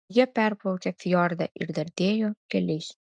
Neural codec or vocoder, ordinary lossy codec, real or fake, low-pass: none; MP3, 96 kbps; real; 9.9 kHz